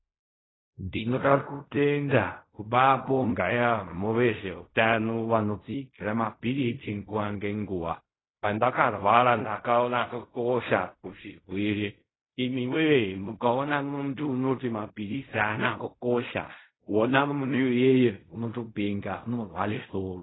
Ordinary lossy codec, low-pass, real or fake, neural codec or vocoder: AAC, 16 kbps; 7.2 kHz; fake; codec, 16 kHz in and 24 kHz out, 0.4 kbps, LongCat-Audio-Codec, fine tuned four codebook decoder